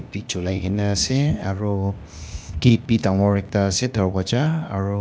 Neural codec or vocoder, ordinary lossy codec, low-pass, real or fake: codec, 16 kHz, 0.8 kbps, ZipCodec; none; none; fake